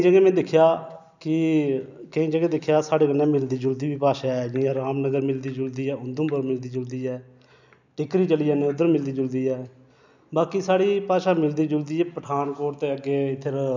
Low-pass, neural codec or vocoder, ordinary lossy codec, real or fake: 7.2 kHz; none; none; real